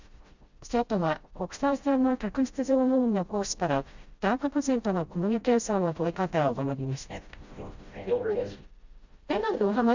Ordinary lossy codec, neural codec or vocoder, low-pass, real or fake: Opus, 64 kbps; codec, 16 kHz, 0.5 kbps, FreqCodec, smaller model; 7.2 kHz; fake